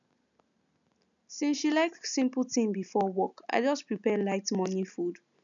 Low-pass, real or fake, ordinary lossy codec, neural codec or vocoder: 7.2 kHz; real; none; none